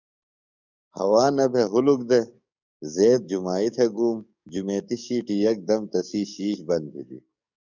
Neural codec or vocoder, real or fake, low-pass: codec, 44.1 kHz, 7.8 kbps, DAC; fake; 7.2 kHz